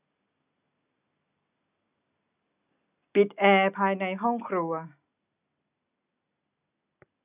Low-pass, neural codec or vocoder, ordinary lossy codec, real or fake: 3.6 kHz; none; none; real